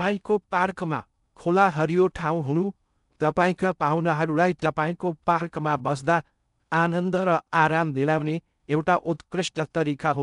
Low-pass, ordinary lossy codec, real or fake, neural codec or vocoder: 10.8 kHz; none; fake; codec, 16 kHz in and 24 kHz out, 0.6 kbps, FocalCodec, streaming, 2048 codes